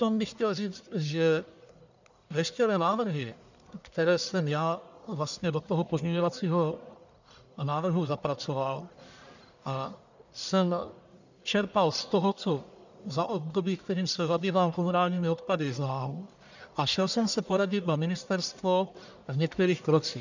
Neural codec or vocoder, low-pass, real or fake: codec, 44.1 kHz, 1.7 kbps, Pupu-Codec; 7.2 kHz; fake